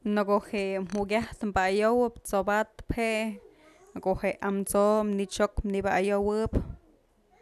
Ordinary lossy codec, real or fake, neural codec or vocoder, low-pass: none; real; none; 14.4 kHz